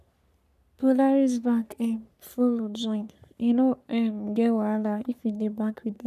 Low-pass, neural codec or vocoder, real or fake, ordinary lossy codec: 14.4 kHz; codec, 44.1 kHz, 3.4 kbps, Pupu-Codec; fake; none